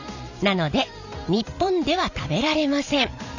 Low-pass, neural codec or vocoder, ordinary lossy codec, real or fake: 7.2 kHz; vocoder, 44.1 kHz, 128 mel bands every 512 samples, BigVGAN v2; none; fake